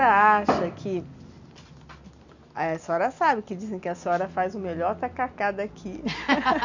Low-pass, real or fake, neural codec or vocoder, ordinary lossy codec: 7.2 kHz; real; none; none